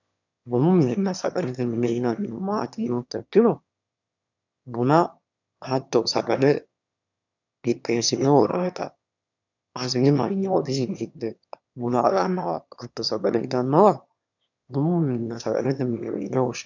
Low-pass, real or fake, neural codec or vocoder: 7.2 kHz; fake; autoencoder, 22.05 kHz, a latent of 192 numbers a frame, VITS, trained on one speaker